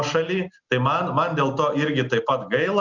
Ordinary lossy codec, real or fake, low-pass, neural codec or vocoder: Opus, 64 kbps; real; 7.2 kHz; none